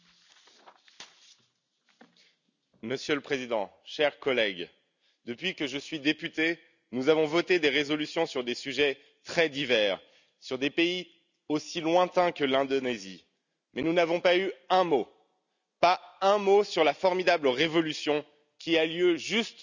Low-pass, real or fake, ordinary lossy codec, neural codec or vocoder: 7.2 kHz; real; none; none